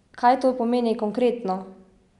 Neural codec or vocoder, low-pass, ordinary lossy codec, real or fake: none; 10.8 kHz; none; real